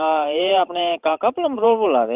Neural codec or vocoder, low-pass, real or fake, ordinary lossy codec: vocoder, 44.1 kHz, 128 mel bands every 512 samples, BigVGAN v2; 3.6 kHz; fake; Opus, 24 kbps